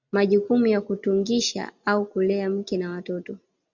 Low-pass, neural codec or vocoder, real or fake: 7.2 kHz; none; real